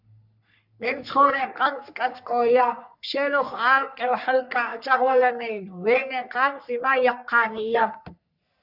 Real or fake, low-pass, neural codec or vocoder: fake; 5.4 kHz; codec, 44.1 kHz, 3.4 kbps, Pupu-Codec